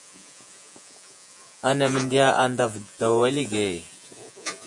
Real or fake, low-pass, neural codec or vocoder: fake; 10.8 kHz; vocoder, 48 kHz, 128 mel bands, Vocos